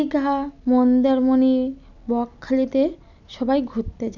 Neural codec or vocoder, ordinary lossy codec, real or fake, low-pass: none; none; real; 7.2 kHz